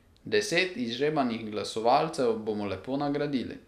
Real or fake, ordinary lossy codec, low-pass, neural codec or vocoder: real; none; 14.4 kHz; none